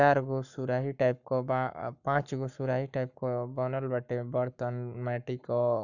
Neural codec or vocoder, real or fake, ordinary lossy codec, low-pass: codec, 44.1 kHz, 7.8 kbps, Pupu-Codec; fake; none; 7.2 kHz